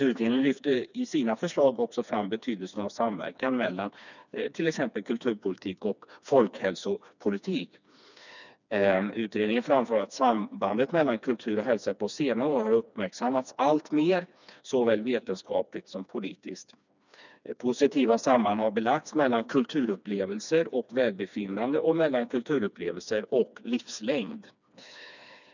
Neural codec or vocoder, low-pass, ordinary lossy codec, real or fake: codec, 16 kHz, 2 kbps, FreqCodec, smaller model; 7.2 kHz; none; fake